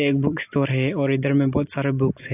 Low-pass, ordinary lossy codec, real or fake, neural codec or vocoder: 3.6 kHz; AAC, 24 kbps; real; none